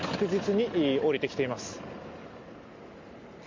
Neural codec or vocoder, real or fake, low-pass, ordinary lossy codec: none; real; 7.2 kHz; MP3, 48 kbps